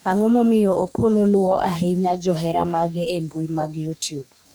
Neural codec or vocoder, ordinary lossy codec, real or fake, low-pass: codec, 44.1 kHz, 2.6 kbps, DAC; none; fake; 19.8 kHz